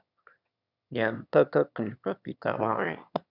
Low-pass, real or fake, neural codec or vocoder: 5.4 kHz; fake; autoencoder, 22.05 kHz, a latent of 192 numbers a frame, VITS, trained on one speaker